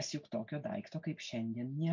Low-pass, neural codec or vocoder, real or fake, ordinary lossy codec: 7.2 kHz; none; real; MP3, 64 kbps